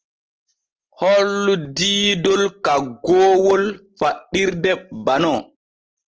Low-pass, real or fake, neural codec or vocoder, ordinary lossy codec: 7.2 kHz; real; none; Opus, 16 kbps